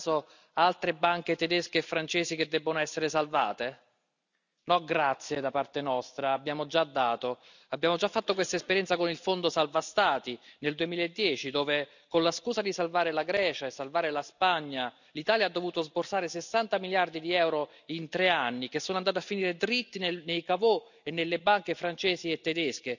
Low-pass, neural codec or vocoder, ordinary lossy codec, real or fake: 7.2 kHz; none; none; real